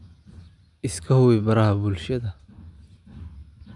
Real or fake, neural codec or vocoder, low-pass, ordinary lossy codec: real; none; 10.8 kHz; none